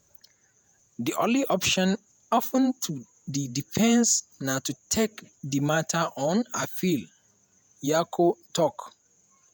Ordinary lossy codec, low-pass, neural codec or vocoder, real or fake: none; none; vocoder, 48 kHz, 128 mel bands, Vocos; fake